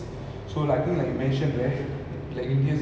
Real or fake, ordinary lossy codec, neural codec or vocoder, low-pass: real; none; none; none